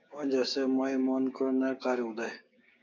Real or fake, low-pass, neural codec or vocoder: fake; 7.2 kHz; codec, 16 kHz, 6 kbps, DAC